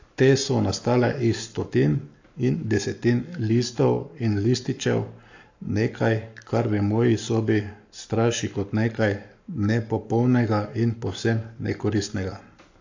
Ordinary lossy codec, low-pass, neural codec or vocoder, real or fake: AAC, 48 kbps; 7.2 kHz; codec, 44.1 kHz, 7.8 kbps, Pupu-Codec; fake